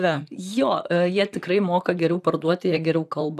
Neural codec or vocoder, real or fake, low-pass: vocoder, 44.1 kHz, 128 mel bands, Pupu-Vocoder; fake; 14.4 kHz